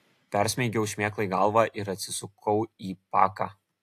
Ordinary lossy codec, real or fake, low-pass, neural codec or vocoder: AAC, 64 kbps; real; 14.4 kHz; none